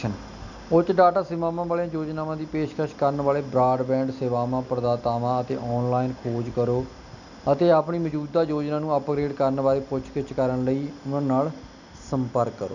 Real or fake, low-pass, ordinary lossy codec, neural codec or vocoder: real; 7.2 kHz; none; none